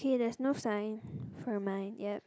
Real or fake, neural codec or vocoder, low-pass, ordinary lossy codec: real; none; none; none